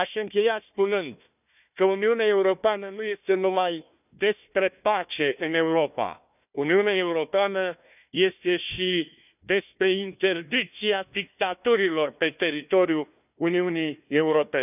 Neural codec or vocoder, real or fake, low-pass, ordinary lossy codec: codec, 16 kHz, 1 kbps, FunCodec, trained on Chinese and English, 50 frames a second; fake; 3.6 kHz; none